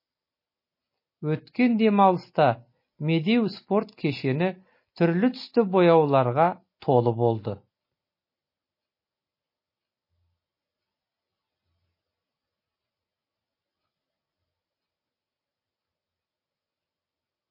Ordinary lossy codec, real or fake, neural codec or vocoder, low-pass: MP3, 24 kbps; real; none; 5.4 kHz